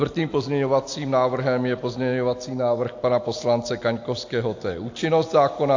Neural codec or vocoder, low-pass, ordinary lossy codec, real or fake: none; 7.2 kHz; AAC, 48 kbps; real